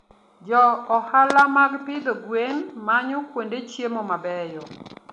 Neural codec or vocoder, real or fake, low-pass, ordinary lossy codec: none; real; 10.8 kHz; none